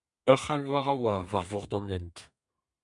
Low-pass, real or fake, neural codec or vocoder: 10.8 kHz; fake; codec, 32 kHz, 1.9 kbps, SNAC